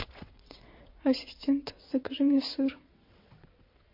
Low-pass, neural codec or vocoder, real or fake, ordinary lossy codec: 5.4 kHz; vocoder, 22.05 kHz, 80 mel bands, WaveNeXt; fake; MP3, 32 kbps